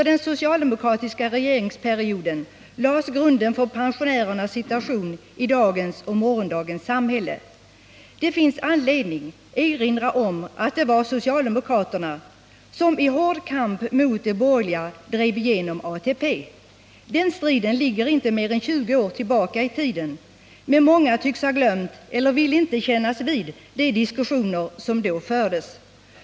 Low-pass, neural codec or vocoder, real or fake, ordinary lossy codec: none; none; real; none